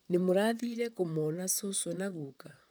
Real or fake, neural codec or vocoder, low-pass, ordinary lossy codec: fake; vocoder, 44.1 kHz, 128 mel bands, Pupu-Vocoder; none; none